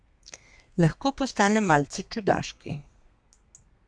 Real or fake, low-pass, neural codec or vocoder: fake; 9.9 kHz; codec, 32 kHz, 1.9 kbps, SNAC